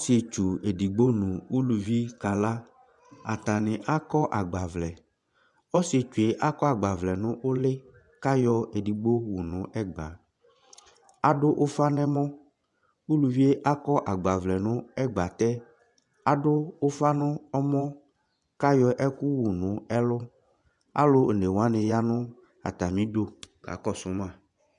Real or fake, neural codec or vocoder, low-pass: fake; vocoder, 24 kHz, 100 mel bands, Vocos; 10.8 kHz